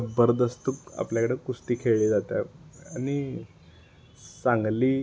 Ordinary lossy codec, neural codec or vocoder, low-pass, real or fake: none; none; none; real